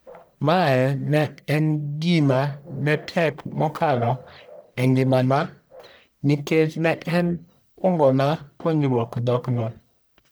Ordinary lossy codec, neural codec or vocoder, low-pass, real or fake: none; codec, 44.1 kHz, 1.7 kbps, Pupu-Codec; none; fake